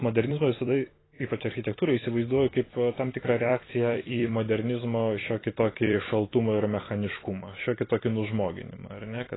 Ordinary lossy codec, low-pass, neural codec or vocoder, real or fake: AAC, 16 kbps; 7.2 kHz; vocoder, 44.1 kHz, 128 mel bands every 256 samples, BigVGAN v2; fake